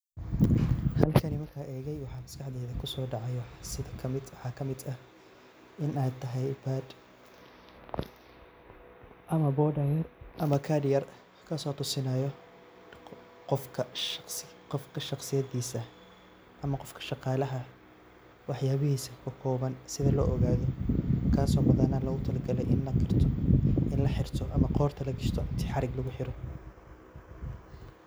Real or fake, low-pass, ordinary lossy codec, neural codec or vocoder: real; none; none; none